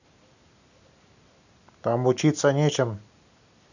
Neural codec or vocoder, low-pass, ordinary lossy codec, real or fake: none; 7.2 kHz; none; real